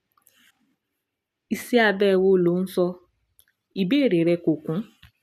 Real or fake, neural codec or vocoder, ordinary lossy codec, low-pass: real; none; none; 14.4 kHz